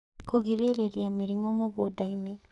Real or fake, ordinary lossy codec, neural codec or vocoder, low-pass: fake; none; codec, 44.1 kHz, 2.6 kbps, SNAC; 10.8 kHz